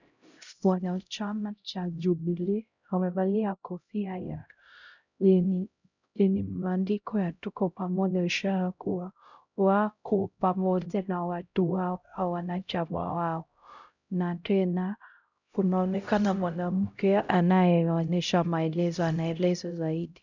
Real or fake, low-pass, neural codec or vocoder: fake; 7.2 kHz; codec, 16 kHz, 0.5 kbps, X-Codec, HuBERT features, trained on LibriSpeech